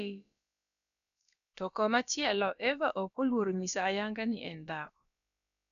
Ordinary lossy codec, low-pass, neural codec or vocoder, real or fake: none; 7.2 kHz; codec, 16 kHz, about 1 kbps, DyCAST, with the encoder's durations; fake